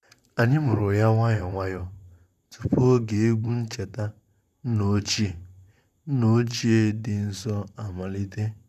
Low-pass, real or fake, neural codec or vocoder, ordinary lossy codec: 14.4 kHz; fake; vocoder, 44.1 kHz, 128 mel bands, Pupu-Vocoder; none